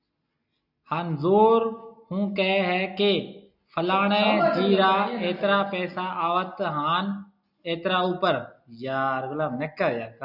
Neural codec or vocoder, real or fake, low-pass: none; real; 5.4 kHz